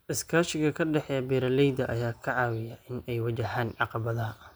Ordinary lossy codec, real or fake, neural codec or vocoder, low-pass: none; real; none; none